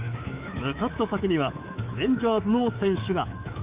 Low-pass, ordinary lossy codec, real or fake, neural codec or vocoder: 3.6 kHz; Opus, 32 kbps; fake; codec, 24 kHz, 3.1 kbps, DualCodec